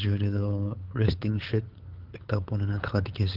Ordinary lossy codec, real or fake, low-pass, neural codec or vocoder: Opus, 16 kbps; fake; 5.4 kHz; codec, 16 kHz, 8 kbps, FunCodec, trained on LibriTTS, 25 frames a second